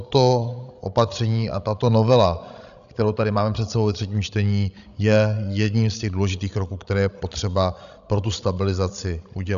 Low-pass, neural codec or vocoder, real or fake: 7.2 kHz; codec, 16 kHz, 16 kbps, FreqCodec, larger model; fake